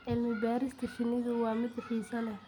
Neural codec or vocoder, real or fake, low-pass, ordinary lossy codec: none; real; 19.8 kHz; none